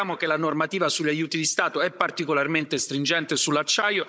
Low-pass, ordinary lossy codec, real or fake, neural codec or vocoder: none; none; fake; codec, 16 kHz, 16 kbps, FunCodec, trained on Chinese and English, 50 frames a second